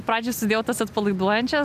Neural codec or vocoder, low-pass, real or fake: none; 14.4 kHz; real